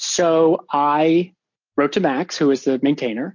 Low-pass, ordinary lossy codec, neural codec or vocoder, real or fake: 7.2 kHz; MP3, 48 kbps; none; real